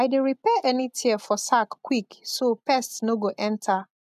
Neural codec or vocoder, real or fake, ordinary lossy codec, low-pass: none; real; MP3, 96 kbps; 14.4 kHz